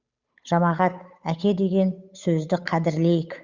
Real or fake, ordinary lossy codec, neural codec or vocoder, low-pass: fake; Opus, 64 kbps; codec, 16 kHz, 8 kbps, FunCodec, trained on Chinese and English, 25 frames a second; 7.2 kHz